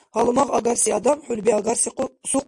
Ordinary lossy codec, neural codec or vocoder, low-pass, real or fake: MP3, 48 kbps; none; 10.8 kHz; real